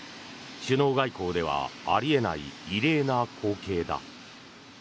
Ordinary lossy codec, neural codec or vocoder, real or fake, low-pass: none; none; real; none